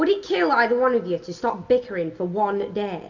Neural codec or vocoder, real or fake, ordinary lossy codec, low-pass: none; real; Opus, 64 kbps; 7.2 kHz